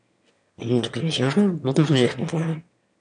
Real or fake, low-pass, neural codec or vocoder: fake; 9.9 kHz; autoencoder, 22.05 kHz, a latent of 192 numbers a frame, VITS, trained on one speaker